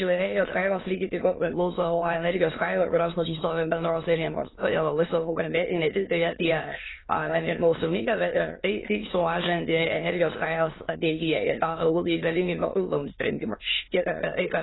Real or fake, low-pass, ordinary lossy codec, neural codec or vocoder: fake; 7.2 kHz; AAC, 16 kbps; autoencoder, 22.05 kHz, a latent of 192 numbers a frame, VITS, trained on many speakers